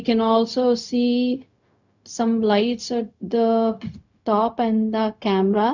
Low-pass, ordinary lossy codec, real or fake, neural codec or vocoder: 7.2 kHz; none; fake; codec, 16 kHz, 0.4 kbps, LongCat-Audio-Codec